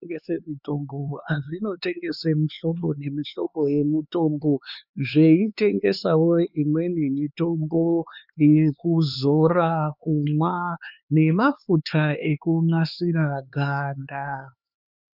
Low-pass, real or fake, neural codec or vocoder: 5.4 kHz; fake; codec, 16 kHz, 4 kbps, X-Codec, HuBERT features, trained on LibriSpeech